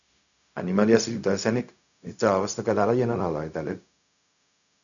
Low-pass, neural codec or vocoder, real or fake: 7.2 kHz; codec, 16 kHz, 0.4 kbps, LongCat-Audio-Codec; fake